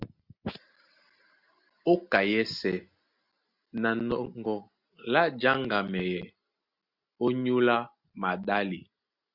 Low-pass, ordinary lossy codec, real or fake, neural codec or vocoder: 5.4 kHz; Opus, 64 kbps; real; none